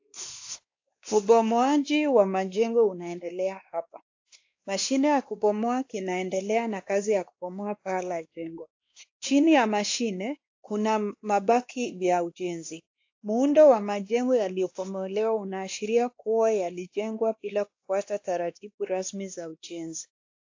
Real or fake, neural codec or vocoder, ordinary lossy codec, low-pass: fake; codec, 16 kHz, 2 kbps, X-Codec, WavLM features, trained on Multilingual LibriSpeech; AAC, 48 kbps; 7.2 kHz